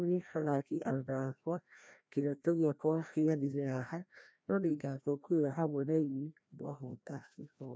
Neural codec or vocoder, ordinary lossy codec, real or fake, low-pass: codec, 16 kHz, 1 kbps, FreqCodec, larger model; none; fake; none